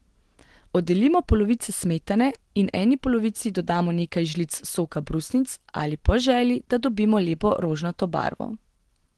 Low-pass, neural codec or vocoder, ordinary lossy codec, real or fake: 10.8 kHz; none; Opus, 16 kbps; real